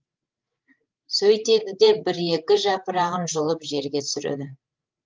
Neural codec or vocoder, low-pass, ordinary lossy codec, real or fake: codec, 16 kHz, 8 kbps, FreqCodec, larger model; 7.2 kHz; Opus, 24 kbps; fake